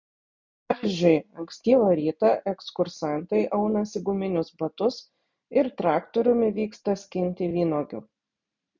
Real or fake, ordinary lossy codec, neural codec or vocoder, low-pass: real; MP3, 64 kbps; none; 7.2 kHz